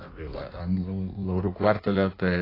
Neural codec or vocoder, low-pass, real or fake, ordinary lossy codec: codec, 16 kHz in and 24 kHz out, 1.1 kbps, FireRedTTS-2 codec; 5.4 kHz; fake; AAC, 24 kbps